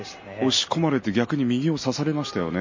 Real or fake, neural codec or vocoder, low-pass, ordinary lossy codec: real; none; 7.2 kHz; MP3, 32 kbps